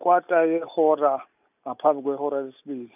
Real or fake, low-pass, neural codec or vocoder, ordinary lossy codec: real; 3.6 kHz; none; none